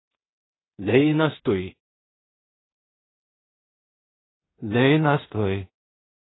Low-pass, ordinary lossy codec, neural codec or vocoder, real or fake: 7.2 kHz; AAC, 16 kbps; codec, 16 kHz in and 24 kHz out, 0.4 kbps, LongCat-Audio-Codec, two codebook decoder; fake